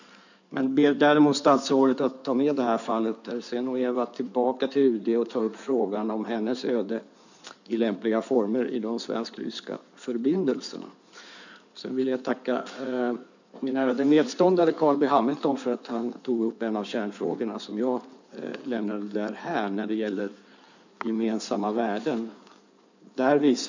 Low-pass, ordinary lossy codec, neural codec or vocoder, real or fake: 7.2 kHz; none; codec, 16 kHz in and 24 kHz out, 2.2 kbps, FireRedTTS-2 codec; fake